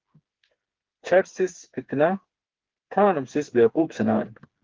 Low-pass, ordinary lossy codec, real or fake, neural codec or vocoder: 7.2 kHz; Opus, 16 kbps; fake; codec, 16 kHz, 4 kbps, FreqCodec, smaller model